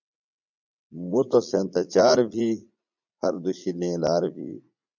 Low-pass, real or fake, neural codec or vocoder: 7.2 kHz; fake; vocoder, 44.1 kHz, 80 mel bands, Vocos